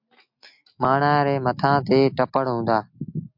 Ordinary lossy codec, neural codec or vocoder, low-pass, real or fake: MP3, 48 kbps; none; 5.4 kHz; real